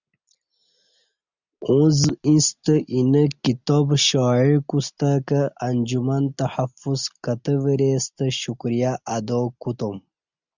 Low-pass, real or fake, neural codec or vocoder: 7.2 kHz; real; none